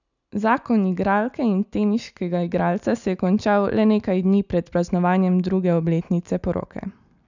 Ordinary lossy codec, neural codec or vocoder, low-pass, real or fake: none; none; 7.2 kHz; real